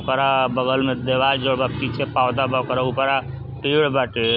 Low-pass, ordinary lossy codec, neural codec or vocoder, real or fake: 5.4 kHz; none; none; real